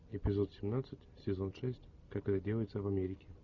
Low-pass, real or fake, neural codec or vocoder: 7.2 kHz; real; none